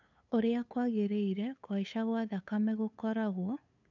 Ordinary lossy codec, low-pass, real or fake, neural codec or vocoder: none; 7.2 kHz; fake; codec, 16 kHz, 8 kbps, FunCodec, trained on LibriTTS, 25 frames a second